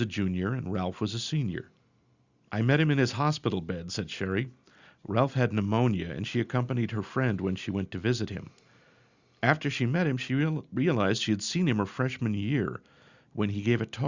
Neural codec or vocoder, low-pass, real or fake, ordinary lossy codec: none; 7.2 kHz; real; Opus, 64 kbps